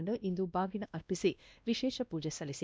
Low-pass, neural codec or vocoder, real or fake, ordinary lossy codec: none; codec, 16 kHz, 1 kbps, X-Codec, WavLM features, trained on Multilingual LibriSpeech; fake; none